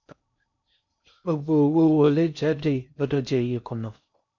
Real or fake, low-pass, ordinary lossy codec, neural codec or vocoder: fake; 7.2 kHz; none; codec, 16 kHz in and 24 kHz out, 0.6 kbps, FocalCodec, streaming, 4096 codes